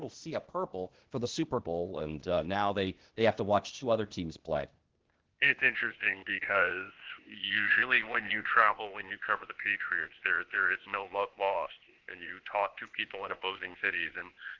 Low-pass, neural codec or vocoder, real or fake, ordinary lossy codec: 7.2 kHz; codec, 16 kHz, 0.8 kbps, ZipCodec; fake; Opus, 16 kbps